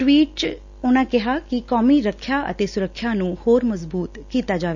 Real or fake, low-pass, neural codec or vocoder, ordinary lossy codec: real; 7.2 kHz; none; none